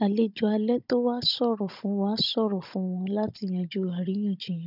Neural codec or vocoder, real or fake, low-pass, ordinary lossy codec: codec, 16 kHz, 16 kbps, FunCodec, trained on Chinese and English, 50 frames a second; fake; 5.4 kHz; none